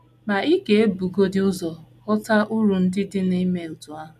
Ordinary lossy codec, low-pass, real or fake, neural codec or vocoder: none; 14.4 kHz; real; none